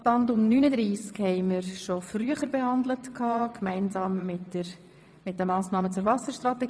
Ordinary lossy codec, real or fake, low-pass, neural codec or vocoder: none; fake; none; vocoder, 22.05 kHz, 80 mel bands, WaveNeXt